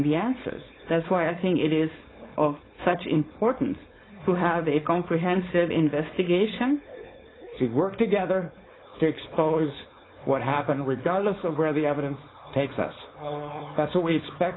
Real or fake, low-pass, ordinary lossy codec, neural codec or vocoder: fake; 7.2 kHz; AAC, 16 kbps; codec, 16 kHz, 4.8 kbps, FACodec